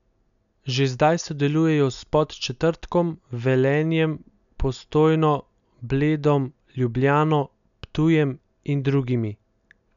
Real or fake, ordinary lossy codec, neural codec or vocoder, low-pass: real; none; none; 7.2 kHz